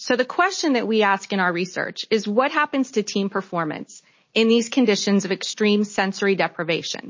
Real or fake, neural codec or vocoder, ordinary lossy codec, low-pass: real; none; MP3, 32 kbps; 7.2 kHz